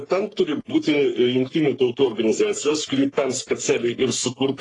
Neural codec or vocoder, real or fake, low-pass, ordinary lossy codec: codec, 44.1 kHz, 3.4 kbps, Pupu-Codec; fake; 10.8 kHz; AAC, 32 kbps